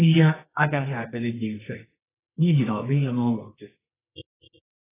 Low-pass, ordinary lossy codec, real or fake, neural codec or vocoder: 3.6 kHz; AAC, 16 kbps; fake; codec, 24 kHz, 0.9 kbps, WavTokenizer, medium music audio release